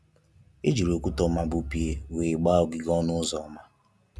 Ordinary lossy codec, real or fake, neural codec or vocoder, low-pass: none; real; none; none